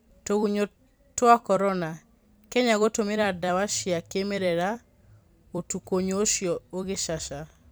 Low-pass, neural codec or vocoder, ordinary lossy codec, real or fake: none; vocoder, 44.1 kHz, 128 mel bands every 256 samples, BigVGAN v2; none; fake